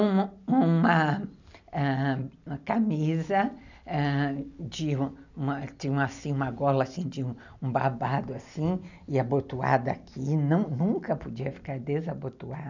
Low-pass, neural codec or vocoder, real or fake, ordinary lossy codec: 7.2 kHz; none; real; none